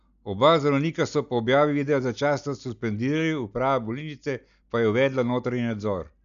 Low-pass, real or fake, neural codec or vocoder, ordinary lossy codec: 7.2 kHz; real; none; none